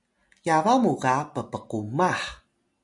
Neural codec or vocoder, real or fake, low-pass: none; real; 10.8 kHz